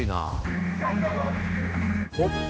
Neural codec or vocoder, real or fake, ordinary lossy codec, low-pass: codec, 16 kHz, 2 kbps, X-Codec, HuBERT features, trained on general audio; fake; none; none